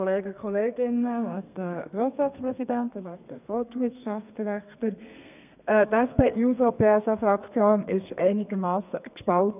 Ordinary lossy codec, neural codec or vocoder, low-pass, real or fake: none; codec, 32 kHz, 1.9 kbps, SNAC; 3.6 kHz; fake